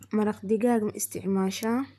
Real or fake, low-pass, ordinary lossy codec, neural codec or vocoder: fake; 14.4 kHz; none; vocoder, 44.1 kHz, 128 mel bands, Pupu-Vocoder